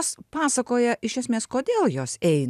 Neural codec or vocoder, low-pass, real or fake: none; 14.4 kHz; real